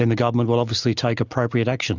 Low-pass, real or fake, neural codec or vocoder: 7.2 kHz; real; none